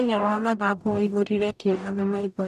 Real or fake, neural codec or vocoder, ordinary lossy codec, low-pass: fake; codec, 44.1 kHz, 0.9 kbps, DAC; none; 14.4 kHz